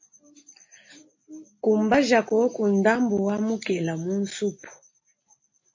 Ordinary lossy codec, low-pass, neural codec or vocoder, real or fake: MP3, 32 kbps; 7.2 kHz; none; real